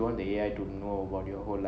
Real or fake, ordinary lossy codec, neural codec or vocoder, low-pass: real; none; none; none